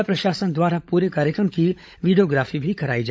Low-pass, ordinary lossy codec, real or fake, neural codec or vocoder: none; none; fake; codec, 16 kHz, 16 kbps, FunCodec, trained on LibriTTS, 50 frames a second